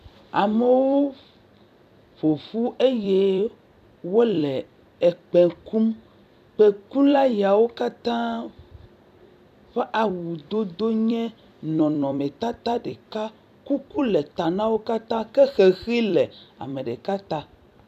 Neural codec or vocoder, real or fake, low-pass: vocoder, 44.1 kHz, 128 mel bands every 256 samples, BigVGAN v2; fake; 14.4 kHz